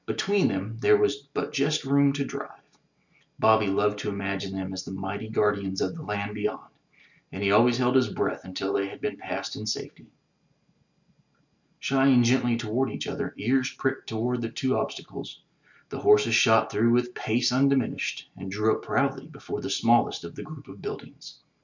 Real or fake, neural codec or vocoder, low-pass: real; none; 7.2 kHz